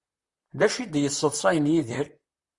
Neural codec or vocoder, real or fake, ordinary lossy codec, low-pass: vocoder, 44.1 kHz, 128 mel bands, Pupu-Vocoder; fake; Opus, 64 kbps; 10.8 kHz